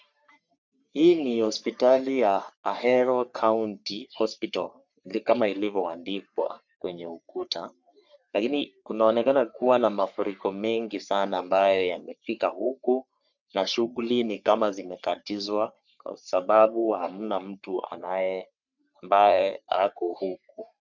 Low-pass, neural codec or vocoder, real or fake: 7.2 kHz; codec, 44.1 kHz, 3.4 kbps, Pupu-Codec; fake